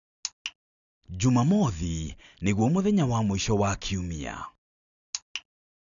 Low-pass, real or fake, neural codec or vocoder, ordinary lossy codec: 7.2 kHz; real; none; none